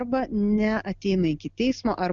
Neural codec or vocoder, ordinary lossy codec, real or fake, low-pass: none; Opus, 64 kbps; real; 7.2 kHz